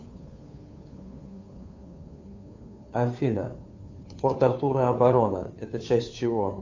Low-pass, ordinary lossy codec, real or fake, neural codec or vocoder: 7.2 kHz; AAC, 48 kbps; fake; codec, 16 kHz, 2 kbps, FunCodec, trained on Chinese and English, 25 frames a second